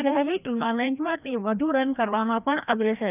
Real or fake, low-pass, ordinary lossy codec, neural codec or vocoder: fake; 3.6 kHz; none; codec, 16 kHz, 1 kbps, FreqCodec, larger model